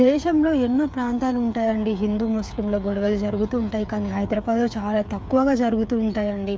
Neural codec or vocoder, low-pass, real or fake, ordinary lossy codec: codec, 16 kHz, 8 kbps, FreqCodec, smaller model; none; fake; none